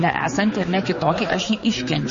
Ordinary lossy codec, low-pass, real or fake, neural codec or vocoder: MP3, 32 kbps; 7.2 kHz; fake; codec, 16 kHz, 4 kbps, X-Codec, HuBERT features, trained on general audio